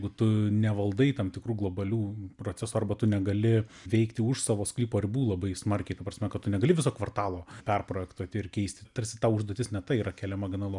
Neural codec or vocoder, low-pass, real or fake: none; 10.8 kHz; real